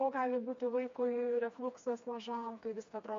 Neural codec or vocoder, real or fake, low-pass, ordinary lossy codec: codec, 16 kHz, 2 kbps, FreqCodec, smaller model; fake; 7.2 kHz; MP3, 48 kbps